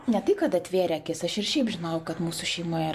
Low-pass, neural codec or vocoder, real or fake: 14.4 kHz; none; real